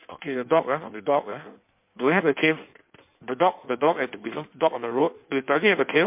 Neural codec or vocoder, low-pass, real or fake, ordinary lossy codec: codec, 16 kHz in and 24 kHz out, 1.1 kbps, FireRedTTS-2 codec; 3.6 kHz; fake; MP3, 32 kbps